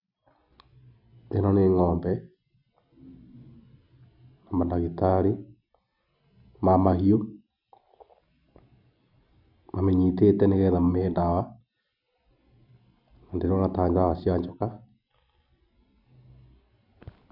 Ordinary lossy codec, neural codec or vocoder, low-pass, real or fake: none; none; 5.4 kHz; real